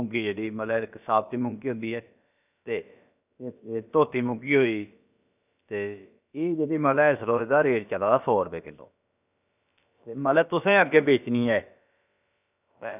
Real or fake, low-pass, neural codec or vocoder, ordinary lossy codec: fake; 3.6 kHz; codec, 16 kHz, about 1 kbps, DyCAST, with the encoder's durations; none